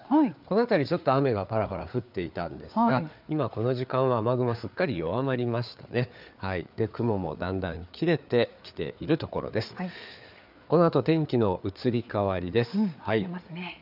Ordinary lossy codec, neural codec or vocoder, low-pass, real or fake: none; codec, 16 kHz, 4 kbps, FreqCodec, larger model; 5.4 kHz; fake